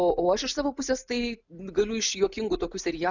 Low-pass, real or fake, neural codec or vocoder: 7.2 kHz; real; none